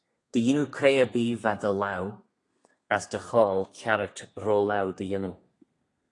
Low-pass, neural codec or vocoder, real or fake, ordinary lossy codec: 10.8 kHz; codec, 32 kHz, 1.9 kbps, SNAC; fake; AAC, 48 kbps